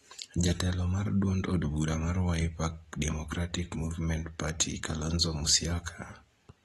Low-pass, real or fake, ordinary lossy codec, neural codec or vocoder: 19.8 kHz; real; AAC, 32 kbps; none